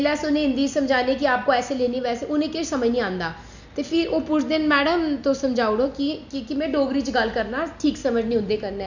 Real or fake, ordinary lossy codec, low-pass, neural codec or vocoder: real; none; 7.2 kHz; none